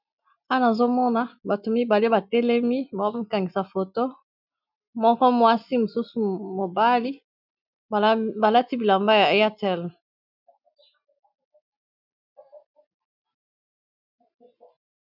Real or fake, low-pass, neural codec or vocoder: real; 5.4 kHz; none